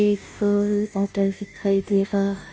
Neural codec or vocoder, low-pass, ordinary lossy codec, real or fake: codec, 16 kHz, 0.5 kbps, FunCodec, trained on Chinese and English, 25 frames a second; none; none; fake